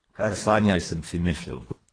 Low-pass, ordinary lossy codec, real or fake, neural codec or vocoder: 9.9 kHz; AAC, 32 kbps; fake; codec, 24 kHz, 1.5 kbps, HILCodec